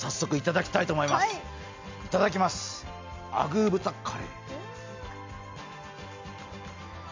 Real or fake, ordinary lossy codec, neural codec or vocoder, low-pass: real; MP3, 64 kbps; none; 7.2 kHz